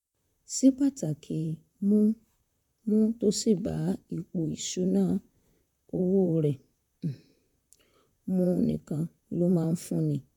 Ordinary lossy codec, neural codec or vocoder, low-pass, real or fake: none; vocoder, 44.1 kHz, 128 mel bands, Pupu-Vocoder; 19.8 kHz; fake